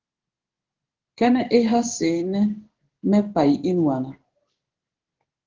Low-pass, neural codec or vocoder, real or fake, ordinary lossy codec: 7.2 kHz; codec, 16 kHz in and 24 kHz out, 1 kbps, XY-Tokenizer; fake; Opus, 16 kbps